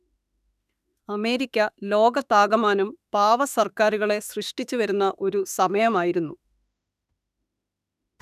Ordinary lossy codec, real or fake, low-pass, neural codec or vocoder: none; fake; 14.4 kHz; autoencoder, 48 kHz, 32 numbers a frame, DAC-VAE, trained on Japanese speech